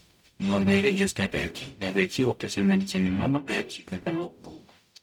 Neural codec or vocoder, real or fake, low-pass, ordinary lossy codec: codec, 44.1 kHz, 0.9 kbps, DAC; fake; 19.8 kHz; none